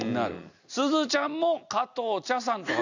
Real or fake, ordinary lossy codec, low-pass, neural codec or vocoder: real; none; 7.2 kHz; none